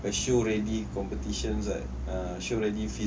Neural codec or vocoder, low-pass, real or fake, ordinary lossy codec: none; none; real; none